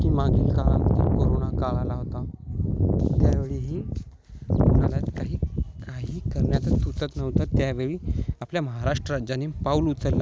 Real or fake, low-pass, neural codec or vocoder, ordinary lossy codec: real; none; none; none